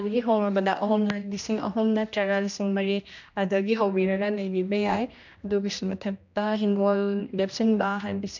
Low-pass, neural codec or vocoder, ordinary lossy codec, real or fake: 7.2 kHz; codec, 16 kHz, 1 kbps, X-Codec, HuBERT features, trained on general audio; none; fake